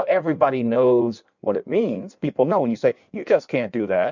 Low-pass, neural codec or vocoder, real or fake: 7.2 kHz; codec, 16 kHz in and 24 kHz out, 1.1 kbps, FireRedTTS-2 codec; fake